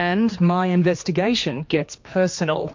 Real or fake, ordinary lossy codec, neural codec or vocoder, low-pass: fake; MP3, 48 kbps; codec, 16 kHz, 2 kbps, X-Codec, HuBERT features, trained on general audio; 7.2 kHz